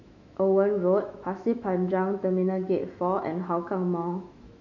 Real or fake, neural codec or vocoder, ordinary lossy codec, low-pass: fake; autoencoder, 48 kHz, 128 numbers a frame, DAC-VAE, trained on Japanese speech; none; 7.2 kHz